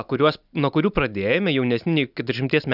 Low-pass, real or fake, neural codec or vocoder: 5.4 kHz; real; none